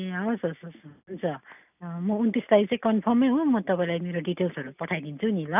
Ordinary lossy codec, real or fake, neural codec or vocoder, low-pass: none; real; none; 3.6 kHz